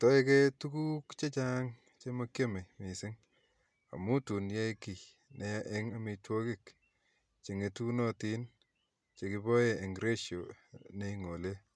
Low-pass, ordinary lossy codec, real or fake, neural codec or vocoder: none; none; real; none